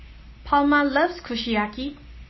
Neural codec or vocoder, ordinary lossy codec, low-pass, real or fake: none; MP3, 24 kbps; 7.2 kHz; real